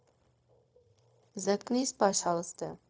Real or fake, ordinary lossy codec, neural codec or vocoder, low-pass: fake; none; codec, 16 kHz, 0.4 kbps, LongCat-Audio-Codec; none